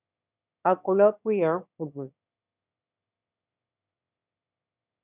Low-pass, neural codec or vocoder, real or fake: 3.6 kHz; autoencoder, 22.05 kHz, a latent of 192 numbers a frame, VITS, trained on one speaker; fake